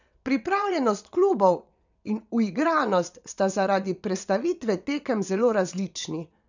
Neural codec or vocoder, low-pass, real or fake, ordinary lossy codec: vocoder, 22.05 kHz, 80 mel bands, WaveNeXt; 7.2 kHz; fake; none